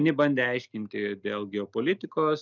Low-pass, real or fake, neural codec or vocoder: 7.2 kHz; real; none